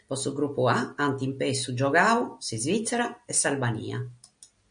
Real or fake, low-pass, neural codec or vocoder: real; 9.9 kHz; none